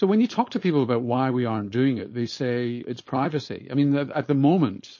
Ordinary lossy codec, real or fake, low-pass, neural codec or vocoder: MP3, 32 kbps; fake; 7.2 kHz; codec, 16 kHz, 4.8 kbps, FACodec